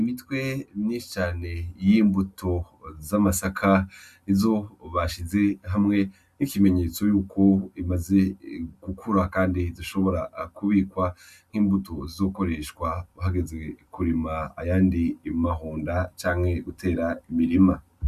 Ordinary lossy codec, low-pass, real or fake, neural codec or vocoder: Opus, 64 kbps; 14.4 kHz; real; none